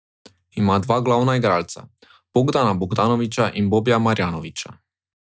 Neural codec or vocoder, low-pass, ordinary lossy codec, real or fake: none; none; none; real